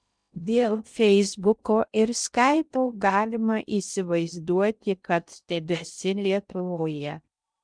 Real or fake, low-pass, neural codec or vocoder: fake; 9.9 kHz; codec, 16 kHz in and 24 kHz out, 0.6 kbps, FocalCodec, streaming, 2048 codes